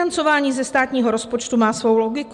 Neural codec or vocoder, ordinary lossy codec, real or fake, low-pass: none; MP3, 96 kbps; real; 10.8 kHz